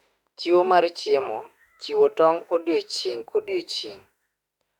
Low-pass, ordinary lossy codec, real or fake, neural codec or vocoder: 19.8 kHz; none; fake; autoencoder, 48 kHz, 32 numbers a frame, DAC-VAE, trained on Japanese speech